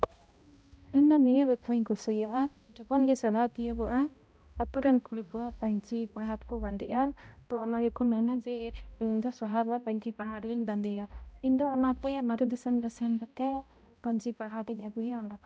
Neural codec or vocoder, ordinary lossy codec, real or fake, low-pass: codec, 16 kHz, 0.5 kbps, X-Codec, HuBERT features, trained on balanced general audio; none; fake; none